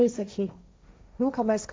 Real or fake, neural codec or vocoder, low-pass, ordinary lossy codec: fake; codec, 16 kHz, 1.1 kbps, Voila-Tokenizer; none; none